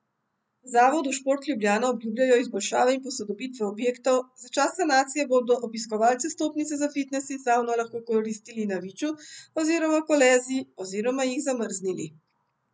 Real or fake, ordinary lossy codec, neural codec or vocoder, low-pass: real; none; none; none